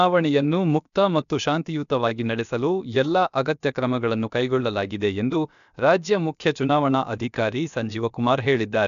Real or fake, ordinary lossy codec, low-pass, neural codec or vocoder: fake; none; 7.2 kHz; codec, 16 kHz, about 1 kbps, DyCAST, with the encoder's durations